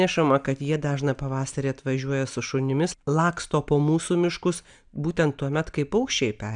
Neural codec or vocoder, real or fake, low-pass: none; real; 9.9 kHz